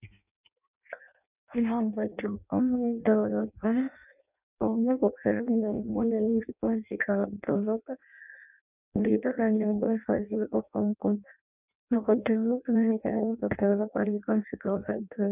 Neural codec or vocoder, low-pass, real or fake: codec, 16 kHz in and 24 kHz out, 0.6 kbps, FireRedTTS-2 codec; 3.6 kHz; fake